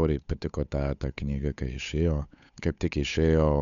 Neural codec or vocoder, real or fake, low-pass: codec, 16 kHz, 8 kbps, FunCodec, trained on Chinese and English, 25 frames a second; fake; 7.2 kHz